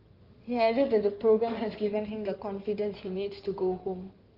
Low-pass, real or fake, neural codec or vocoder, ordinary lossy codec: 5.4 kHz; fake; codec, 16 kHz in and 24 kHz out, 2.2 kbps, FireRedTTS-2 codec; Opus, 24 kbps